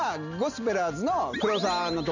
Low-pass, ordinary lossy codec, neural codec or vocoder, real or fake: 7.2 kHz; none; none; real